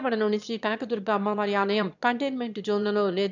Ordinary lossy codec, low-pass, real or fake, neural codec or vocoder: none; 7.2 kHz; fake; autoencoder, 22.05 kHz, a latent of 192 numbers a frame, VITS, trained on one speaker